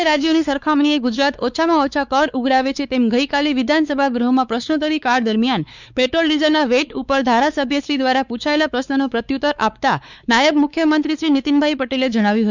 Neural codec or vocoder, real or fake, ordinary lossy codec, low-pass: codec, 16 kHz, 4 kbps, X-Codec, WavLM features, trained on Multilingual LibriSpeech; fake; none; 7.2 kHz